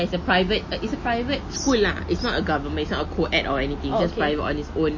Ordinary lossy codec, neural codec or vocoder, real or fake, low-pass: MP3, 32 kbps; none; real; 7.2 kHz